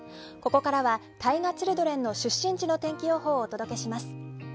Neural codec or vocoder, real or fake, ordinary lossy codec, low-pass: none; real; none; none